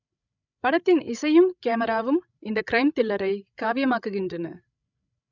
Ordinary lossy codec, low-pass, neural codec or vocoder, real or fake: none; 7.2 kHz; codec, 16 kHz, 16 kbps, FreqCodec, larger model; fake